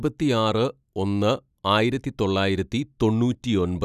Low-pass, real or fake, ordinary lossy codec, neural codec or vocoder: 14.4 kHz; real; none; none